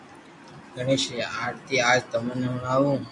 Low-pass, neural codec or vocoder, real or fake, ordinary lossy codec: 10.8 kHz; none; real; MP3, 96 kbps